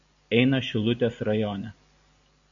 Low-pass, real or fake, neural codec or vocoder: 7.2 kHz; real; none